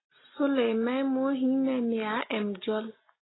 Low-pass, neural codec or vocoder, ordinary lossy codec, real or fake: 7.2 kHz; none; AAC, 16 kbps; real